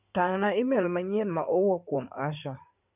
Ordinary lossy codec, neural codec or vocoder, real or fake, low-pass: none; codec, 16 kHz in and 24 kHz out, 2.2 kbps, FireRedTTS-2 codec; fake; 3.6 kHz